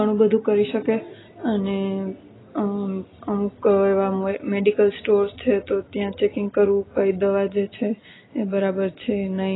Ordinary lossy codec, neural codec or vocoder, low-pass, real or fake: AAC, 16 kbps; none; 7.2 kHz; real